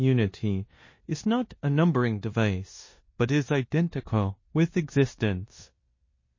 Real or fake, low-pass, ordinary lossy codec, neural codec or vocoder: fake; 7.2 kHz; MP3, 32 kbps; codec, 16 kHz in and 24 kHz out, 0.9 kbps, LongCat-Audio-Codec, four codebook decoder